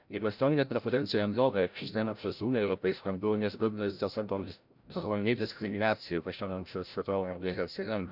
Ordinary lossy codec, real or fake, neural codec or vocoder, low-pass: none; fake; codec, 16 kHz, 0.5 kbps, FreqCodec, larger model; 5.4 kHz